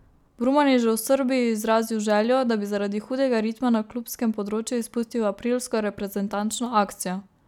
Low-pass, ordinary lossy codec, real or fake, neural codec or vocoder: 19.8 kHz; none; real; none